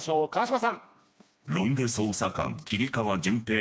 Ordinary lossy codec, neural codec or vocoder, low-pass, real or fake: none; codec, 16 kHz, 2 kbps, FreqCodec, smaller model; none; fake